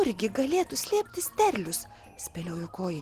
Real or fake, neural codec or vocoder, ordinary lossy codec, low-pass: real; none; Opus, 16 kbps; 14.4 kHz